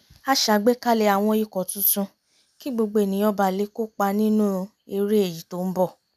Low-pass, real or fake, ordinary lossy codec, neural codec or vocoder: 14.4 kHz; real; none; none